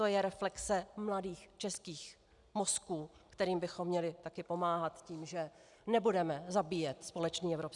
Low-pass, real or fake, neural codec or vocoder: 10.8 kHz; real; none